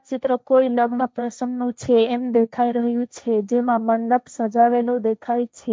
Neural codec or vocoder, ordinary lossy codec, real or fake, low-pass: codec, 16 kHz, 1.1 kbps, Voila-Tokenizer; none; fake; none